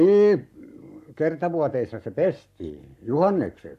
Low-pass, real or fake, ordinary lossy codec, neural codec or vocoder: 14.4 kHz; fake; none; codec, 44.1 kHz, 7.8 kbps, Pupu-Codec